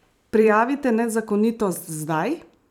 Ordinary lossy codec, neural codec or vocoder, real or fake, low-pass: none; vocoder, 44.1 kHz, 128 mel bands every 256 samples, BigVGAN v2; fake; 19.8 kHz